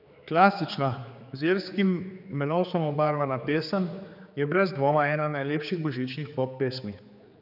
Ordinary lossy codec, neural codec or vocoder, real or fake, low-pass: none; codec, 16 kHz, 4 kbps, X-Codec, HuBERT features, trained on general audio; fake; 5.4 kHz